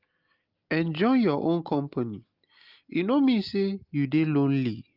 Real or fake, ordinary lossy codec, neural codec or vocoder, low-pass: real; Opus, 32 kbps; none; 5.4 kHz